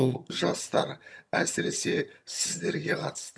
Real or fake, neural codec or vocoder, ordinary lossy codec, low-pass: fake; vocoder, 22.05 kHz, 80 mel bands, HiFi-GAN; none; none